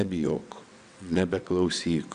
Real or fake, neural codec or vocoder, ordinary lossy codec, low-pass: fake; vocoder, 22.05 kHz, 80 mel bands, WaveNeXt; Opus, 64 kbps; 9.9 kHz